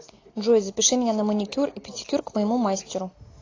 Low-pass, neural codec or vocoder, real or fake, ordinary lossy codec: 7.2 kHz; none; real; AAC, 32 kbps